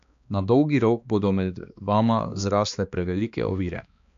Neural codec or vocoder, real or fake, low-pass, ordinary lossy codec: codec, 16 kHz, 2 kbps, X-Codec, HuBERT features, trained on balanced general audio; fake; 7.2 kHz; MP3, 64 kbps